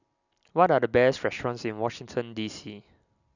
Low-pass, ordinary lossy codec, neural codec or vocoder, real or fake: 7.2 kHz; none; none; real